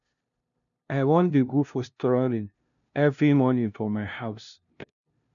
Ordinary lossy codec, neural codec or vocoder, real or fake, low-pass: none; codec, 16 kHz, 0.5 kbps, FunCodec, trained on LibriTTS, 25 frames a second; fake; 7.2 kHz